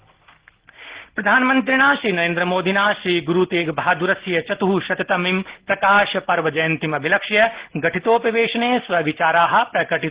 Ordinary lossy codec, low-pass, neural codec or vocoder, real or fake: Opus, 16 kbps; 3.6 kHz; none; real